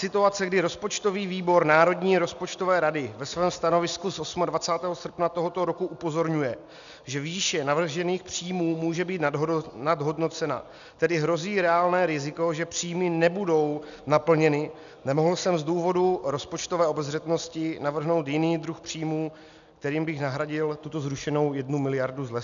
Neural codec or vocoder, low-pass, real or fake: none; 7.2 kHz; real